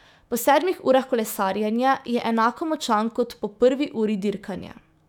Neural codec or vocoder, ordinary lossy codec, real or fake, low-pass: autoencoder, 48 kHz, 128 numbers a frame, DAC-VAE, trained on Japanese speech; none; fake; 19.8 kHz